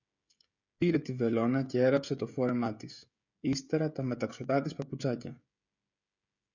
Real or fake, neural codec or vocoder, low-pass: fake; codec, 16 kHz, 16 kbps, FreqCodec, smaller model; 7.2 kHz